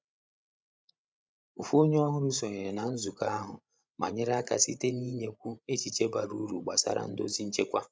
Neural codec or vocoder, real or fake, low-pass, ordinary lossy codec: codec, 16 kHz, 16 kbps, FreqCodec, larger model; fake; none; none